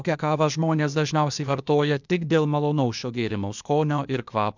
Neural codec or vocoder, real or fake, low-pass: codec, 16 kHz, 0.8 kbps, ZipCodec; fake; 7.2 kHz